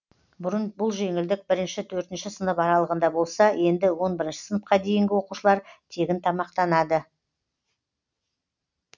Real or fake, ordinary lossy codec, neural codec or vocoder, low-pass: real; none; none; 7.2 kHz